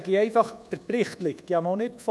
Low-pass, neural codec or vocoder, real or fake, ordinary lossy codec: none; codec, 24 kHz, 1.2 kbps, DualCodec; fake; none